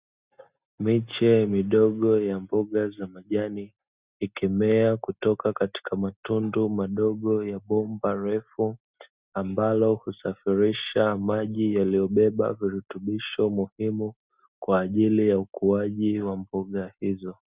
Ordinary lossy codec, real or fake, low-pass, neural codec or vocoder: Opus, 64 kbps; real; 3.6 kHz; none